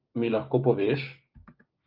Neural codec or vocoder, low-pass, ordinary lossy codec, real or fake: codec, 16 kHz, 6 kbps, DAC; 5.4 kHz; Opus, 32 kbps; fake